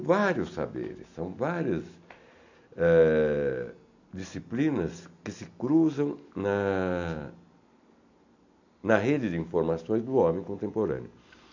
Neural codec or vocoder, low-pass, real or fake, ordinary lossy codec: none; 7.2 kHz; real; none